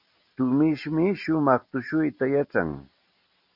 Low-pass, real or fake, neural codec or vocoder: 5.4 kHz; real; none